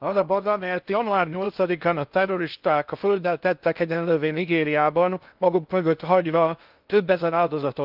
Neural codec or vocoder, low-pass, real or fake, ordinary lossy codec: codec, 16 kHz in and 24 kHz out, 0.6 kbps, FocalCodec, streaming, 2048 codes; 5.4 kHz; fake; Opus, 24 kbps